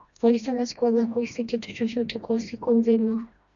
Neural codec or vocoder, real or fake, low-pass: codec, 16 kHz, 1 kbps, FreqCodec, smaller model; fake; 7.2 kHz